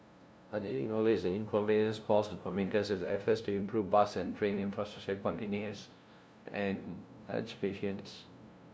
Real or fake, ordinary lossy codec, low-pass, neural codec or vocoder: fake; none; none; codec, 16 kHz, 0.5 kbps, FunCodec, trained on LibriTTS, 25 frames a second